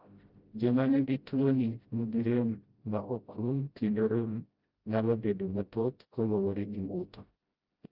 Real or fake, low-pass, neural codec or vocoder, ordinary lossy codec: fake; 5.4 kHz; codec, 16 kHz, 0.5 kbps, FreqCodec, smaller model; Opus, 32 kbps